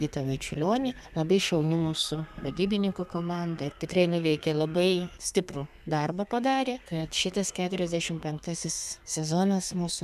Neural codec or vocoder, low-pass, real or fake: codec, 32 kHz, 1.9 kbps, SNAC; 14.4 kHz; fake